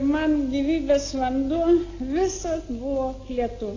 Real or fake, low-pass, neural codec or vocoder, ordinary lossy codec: real; 7.2 kHz; none; AAC, 32 kbps